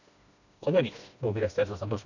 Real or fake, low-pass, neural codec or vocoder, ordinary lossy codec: fake; 7.2 kHz; codec, 16 kHz, 1 kbps, FreqCodec, smaller model; none